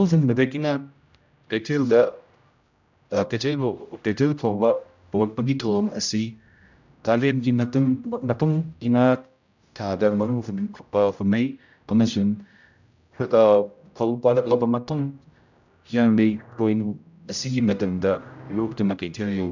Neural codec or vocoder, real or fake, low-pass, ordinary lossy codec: codec, 16 kHz, 0.5 kbps, X-Codec, HuBERT features, trained on general audio; fake; 7.2 kHz; none